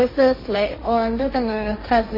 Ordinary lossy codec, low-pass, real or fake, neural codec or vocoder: MP3, 24 kbps; 5.4 kHz; fake; codec, 16 kHz, 1.1 kbps, Voila-Tokenizer